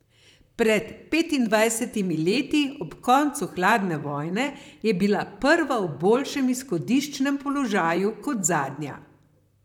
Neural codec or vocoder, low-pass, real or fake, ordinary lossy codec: vocoder, 44.1 kHz, 128 mel bands, Pupu-Vocoder; 19.8 kHz; fake; none